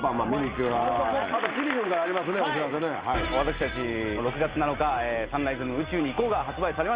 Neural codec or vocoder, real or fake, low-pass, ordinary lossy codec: none; real; 3.6 kHz; Opus, 32 kbps